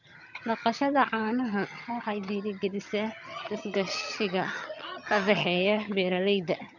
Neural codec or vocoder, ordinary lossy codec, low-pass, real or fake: vocoder, 22.05 kHz, 80 mel bands, HiFi-GAN; none; 7.2 kHz; fake